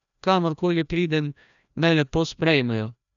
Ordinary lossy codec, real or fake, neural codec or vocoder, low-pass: none; fake; codec, 16 kHz, 1 kbps, FreqCodec, larger model; 7.2 kHz